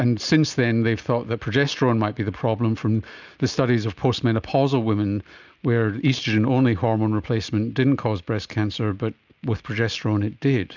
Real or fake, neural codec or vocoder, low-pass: real; none; 7.2 kHz